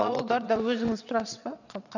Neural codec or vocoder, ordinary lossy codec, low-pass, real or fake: vocoder, 22.05 kHz, 80 mel bands, WaveNeXt; none; 7.2 kHz; fake